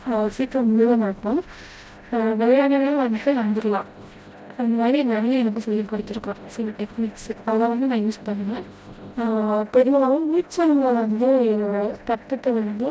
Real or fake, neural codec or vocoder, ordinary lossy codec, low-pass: fake; codec, 16 kHz, 0.5 kbps, FreqCodec, smaller model; none; none